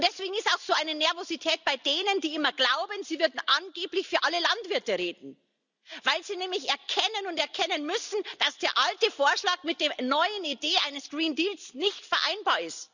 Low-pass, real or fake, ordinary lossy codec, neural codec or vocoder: 7.2 kHz; real; none; none